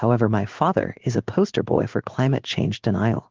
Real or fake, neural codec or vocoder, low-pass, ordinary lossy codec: fake; codec, 16 kHz in and 24 kHz out, 1 kbps, XY-Tokenizer; 7.2 kHz; Opus, 16 kbps